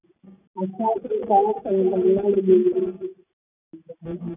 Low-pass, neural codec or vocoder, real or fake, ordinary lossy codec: 3.6 kHz; none; real; none